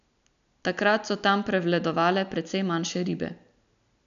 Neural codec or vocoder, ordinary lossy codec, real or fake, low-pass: none; none; real; 7.2 kHz